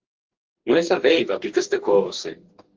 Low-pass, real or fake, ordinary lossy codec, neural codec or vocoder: 7.2 kHz; fake; Opus, 16 kbps; codec, 44.1 kHz, 2.6 kbps, DAC